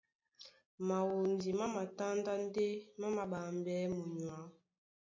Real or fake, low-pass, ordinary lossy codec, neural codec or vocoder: real; 7.2 kHz; MP3, 48 kbps; none